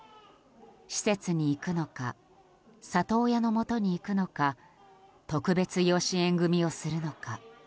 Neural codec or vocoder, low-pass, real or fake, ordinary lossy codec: none; none; real; none